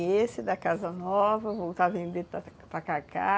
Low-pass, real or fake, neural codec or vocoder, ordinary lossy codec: none; real; none; none